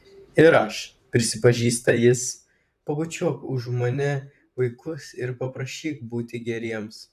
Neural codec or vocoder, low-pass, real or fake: vocoder, 44.1 kHz, 128 mel bands, Pupu-Vocoder; 14.4 kHz; fake